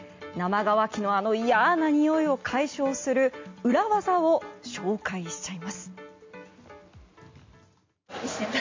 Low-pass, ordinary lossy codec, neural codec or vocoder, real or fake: 7.2 kHz; MP3, 48 kbps; none; real